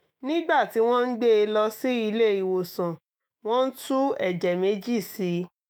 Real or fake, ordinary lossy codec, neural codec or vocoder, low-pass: fake; none; autoencoder, 48 kHz, 128 numbers a frame, DAC-VAE, trained on Japanese speech; none